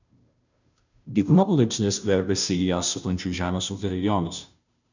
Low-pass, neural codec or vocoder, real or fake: 7.2 kHz; codec, 16 kHz, 0.5 kbps, FunCodec, trained on Chinese and English, 25 frames a second; fake